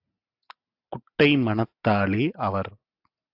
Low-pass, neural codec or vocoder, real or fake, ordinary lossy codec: 5.4 kHz; none; real; AAC, 48 kbps